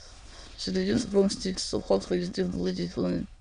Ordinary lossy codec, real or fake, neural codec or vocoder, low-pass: none; fake; autoencoder, 22.05 kHz, a latent of 192 numbers a frame, VITS, trained on many speakers; 9.9 kHz